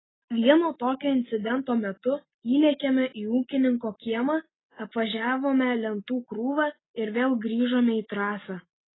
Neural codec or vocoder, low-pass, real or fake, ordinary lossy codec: none; 7.2 kHz; real; AAC, 16 kbps